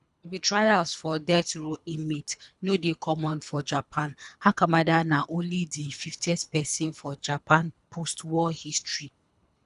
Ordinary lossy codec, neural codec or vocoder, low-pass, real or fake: none; codec, 24 kHz, 3 kbps, HILCodec; 10.8 kHz; fake